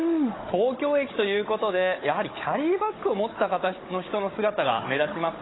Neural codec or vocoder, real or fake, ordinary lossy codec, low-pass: codec, 16 kHz, 16 kbps, FunCodec, trained on Chinese and English, 50 frames a second; fake; AAC, 16 kbps; 7.2 kHz